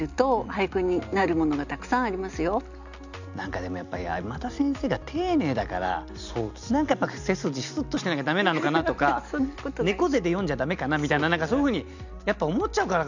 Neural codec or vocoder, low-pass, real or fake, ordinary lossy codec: none; 7.2 kHz; real; none